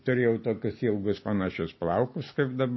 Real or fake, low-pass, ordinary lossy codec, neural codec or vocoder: real; 7.2 kHz; MP3, 24 kbps; none